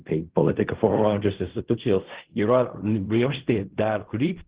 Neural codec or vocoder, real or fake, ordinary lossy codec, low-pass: codec, 16 kHz in and 24 kHz out, 0.4 kbps, LongCat-Audio-Codec, fine tuned four codebook decoder; fake; Opus, 16 kbps; 3.6 kHz